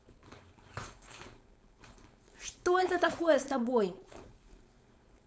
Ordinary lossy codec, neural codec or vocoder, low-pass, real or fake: none; codec, 16 kHz, 4.8 kbps, FACodec; none; fake